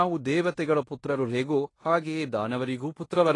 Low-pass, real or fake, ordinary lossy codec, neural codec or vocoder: 10.8 kHz; fake; AAC, 32 kbps; codec, 16 kHz in and 24 kHz out, 0.9 kbps, LongCat-Audio-Codec, fine tuned four codebook decoder